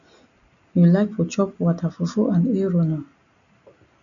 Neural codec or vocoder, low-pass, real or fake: none; 7.2 kHz; real